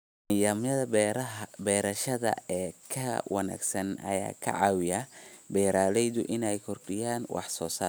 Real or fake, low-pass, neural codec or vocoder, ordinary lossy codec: real; none; none; none